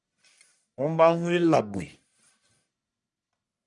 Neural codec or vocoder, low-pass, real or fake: codec, 44.1 kHz, 1.7 kbps, Pupu-Codec; 10.8 kHz; fake